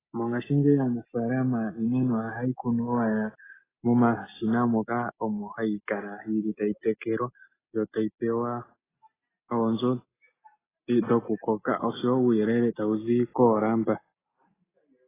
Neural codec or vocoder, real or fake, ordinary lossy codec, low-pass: none; real; AAC, 16 kbps; 3.6 kHz